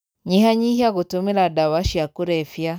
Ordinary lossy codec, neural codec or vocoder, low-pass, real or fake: none; none; none; real